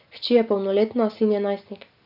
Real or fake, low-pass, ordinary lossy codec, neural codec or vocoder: real; 5.4 kHz; none; none